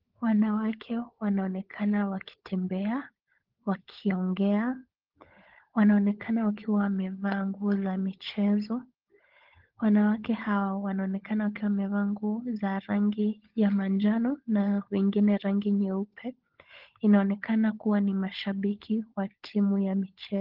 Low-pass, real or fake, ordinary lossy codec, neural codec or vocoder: 5.4 kHz; fake; Opus, 16 kbps; codec, 16 kHz, 16 kbps, FunCodec, trained on LibriTTS, 50 frames a second